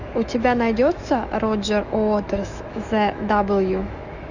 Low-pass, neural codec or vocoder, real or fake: 7.2 kHz; autoencoder, 48 kHz, 128 numbers a frame, DAC-VAE, trained on Japanese speech; fake